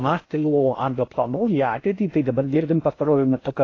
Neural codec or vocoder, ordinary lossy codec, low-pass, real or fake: codec, 16 kHz in and 24 kHz out, 0.6 kbps, FocalCodec, streaming, 2048 codes; AAC, 32 kbps; 7.2 kHz; fake